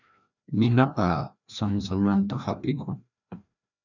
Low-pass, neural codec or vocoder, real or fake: 7.2 kHz; codec, 16 kHz, 1 kbps, FreqCodec, larger model; fake